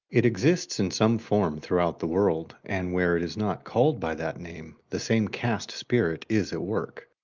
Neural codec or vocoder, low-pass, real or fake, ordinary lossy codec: none; 7.2 kHz; real; Opus, 24 kbps